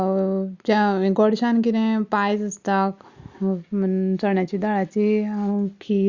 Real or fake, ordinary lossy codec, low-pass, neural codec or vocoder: real; Opus, 64 kbps; 7.2 kHz; none